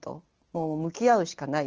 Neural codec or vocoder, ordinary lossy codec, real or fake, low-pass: none; Opus, 16 kbps; real; 7.2 kHz